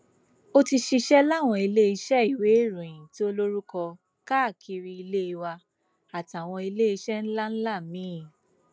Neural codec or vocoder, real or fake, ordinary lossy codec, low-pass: none; real; none; none